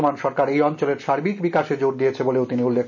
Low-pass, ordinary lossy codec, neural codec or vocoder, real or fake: 7.2 kHz; none; none; real